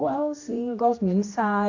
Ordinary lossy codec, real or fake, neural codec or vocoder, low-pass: AAC, 48 kbps; fake; codec, 16 kHz, 1 kbps, X-Codec, HuBERT features, trained on general audio; 7.2 kHz